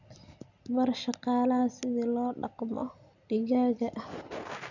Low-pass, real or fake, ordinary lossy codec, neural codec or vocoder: 7.2 kHz; real; none; none